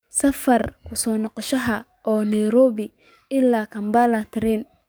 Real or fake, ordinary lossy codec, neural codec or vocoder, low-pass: fake; none; codec, 44.1 kHz, 7.8 kbps, DAC; none